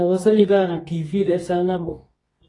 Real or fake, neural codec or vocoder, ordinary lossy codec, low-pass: fake; codec, 24 kHz, 0.9 kbps, WavTokenizer, medium music audio release; AAC, 32 kbps; 10.8 kHz